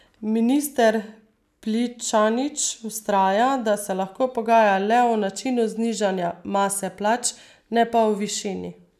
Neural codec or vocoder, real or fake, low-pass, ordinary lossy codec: none; real; 14.4 kHz; none